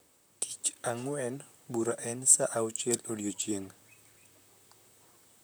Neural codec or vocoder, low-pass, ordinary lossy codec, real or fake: vocoder, 44.1 kHz, 128 mel bands, Pupu-Vocoder; none; none; fake